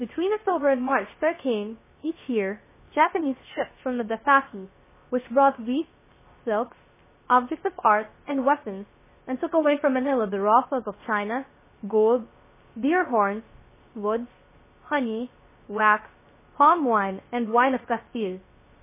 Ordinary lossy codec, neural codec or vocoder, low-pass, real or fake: MP3, 16 kbps; codec, 16 kHz, 0.3 kbps, FocalCodec; 3.6 kHz; fake